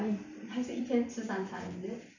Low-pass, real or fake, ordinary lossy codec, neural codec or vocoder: 7.2 kHz; fake; none; vocoder, 44.1 kHz, 128 mel bands every 512 samples, BigVGAN v2